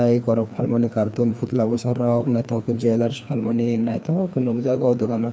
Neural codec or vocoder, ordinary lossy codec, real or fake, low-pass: codec, 16 kHz, 2 kbps, FreqCodec, larger model; none; fake; none